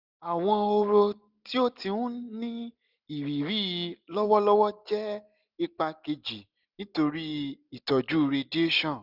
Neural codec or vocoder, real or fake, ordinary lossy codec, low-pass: none; real; none; 5.4 kHz